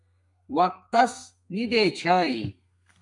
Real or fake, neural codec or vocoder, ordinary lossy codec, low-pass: fake; codec, 32 kHz, 1.9 kbps, SNAC; MP3, 96 kbps; 10.8 kHz